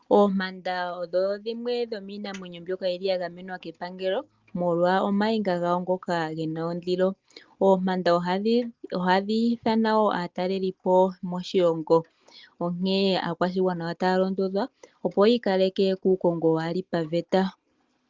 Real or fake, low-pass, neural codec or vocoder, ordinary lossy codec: real; 7.2 kHz; none; Opus, 32 kbps